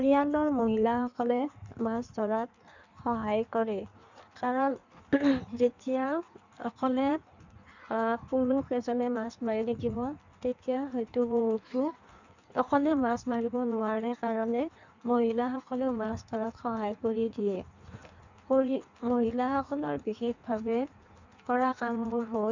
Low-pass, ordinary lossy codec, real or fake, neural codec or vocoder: 7.2 kHz; none; fake; codec, 16 kHz in and 24 kHz out, 1.1 kbps, FireRedTTS-2 codec